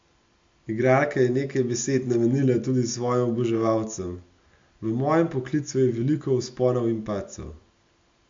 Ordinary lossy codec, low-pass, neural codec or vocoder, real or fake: MP3, 48 kbps; 7.2 kHz; none; real